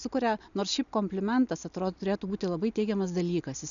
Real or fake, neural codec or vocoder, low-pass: real; none; 7.2 kHz